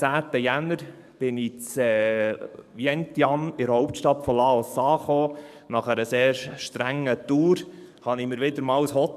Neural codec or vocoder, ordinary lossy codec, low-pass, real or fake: codec, 44.1 kHz, 7.8 kbps, DAC; MP3, 96 kbps; 14.4 kHz; fake